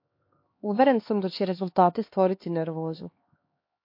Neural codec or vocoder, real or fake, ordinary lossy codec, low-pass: codec, 16 kHz, 2 kbps, X-Codec, HuBERT features, trained on LibriSpeech; fake; MP3, 32 kbps; 5.4 kHz